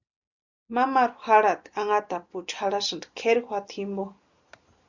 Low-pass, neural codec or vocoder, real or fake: 7.2 kHz; none; real